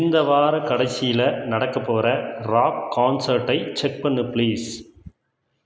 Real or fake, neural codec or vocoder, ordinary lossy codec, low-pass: real; none; none; none